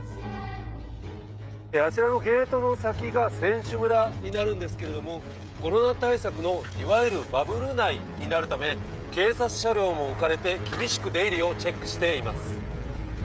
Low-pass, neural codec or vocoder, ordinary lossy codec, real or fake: none; codec, 16 kHz, 16 kbps, FreqCodec, smaller model; none; fake